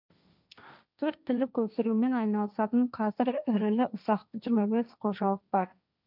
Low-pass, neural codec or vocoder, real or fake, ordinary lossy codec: 5.4 kHz; codec, 16 kHz, 1.1 kbps, Voila-Tokenizer; fake; none